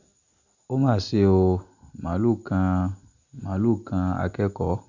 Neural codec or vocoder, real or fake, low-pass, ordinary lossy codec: none; real; 7.2 kHz; Opus, 64 kbps